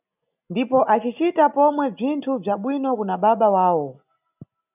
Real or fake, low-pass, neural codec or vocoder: real; 3.6 kHz; none